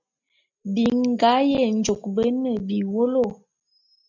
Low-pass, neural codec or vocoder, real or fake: 7.2 kHz; none; real